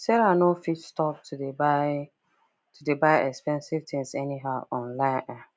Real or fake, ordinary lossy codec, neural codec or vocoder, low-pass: real; none; none; none